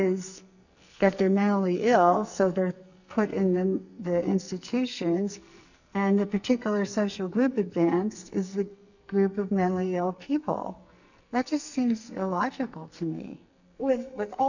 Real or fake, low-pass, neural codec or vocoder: fake; 7.2 kHz; codec, 44.1 kHz, 2.6 kbps, SNAC